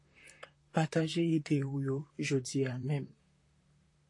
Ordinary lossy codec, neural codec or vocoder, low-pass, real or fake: AAC, 48 kbps; vocoder, 44.1 kHz, 128 mel bands, Pupu-Vocoder; 10.8 kHz; fake